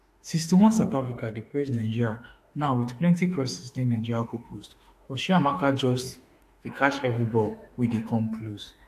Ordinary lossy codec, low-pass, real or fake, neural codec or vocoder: AAC, 64 kbps; 14.4 kHz; fake; autoencoder, 48 kHz, 32 numbers a frame, DAC-VAE, trained on Japanese speech